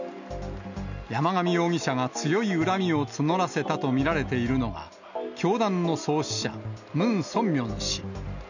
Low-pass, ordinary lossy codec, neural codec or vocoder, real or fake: 7.2 kHz; none; none; real